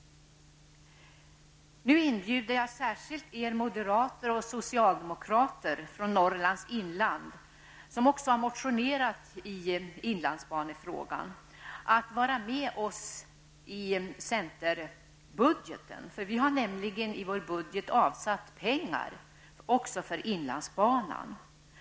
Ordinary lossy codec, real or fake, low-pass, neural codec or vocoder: none; real; none; none